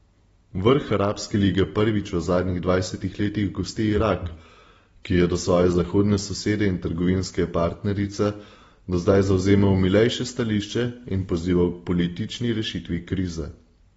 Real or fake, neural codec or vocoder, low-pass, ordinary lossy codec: real; none; 10.8 kHz; AAC, 24 kbps